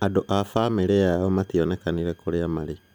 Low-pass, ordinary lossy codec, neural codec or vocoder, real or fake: none; none; none; real